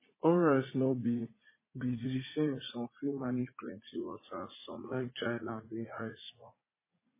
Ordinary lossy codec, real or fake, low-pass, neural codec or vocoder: MP3, 16 kbps; fake; 3.6 kHz; vocoder, 44.1 kHz, 80 mel bands, Vocos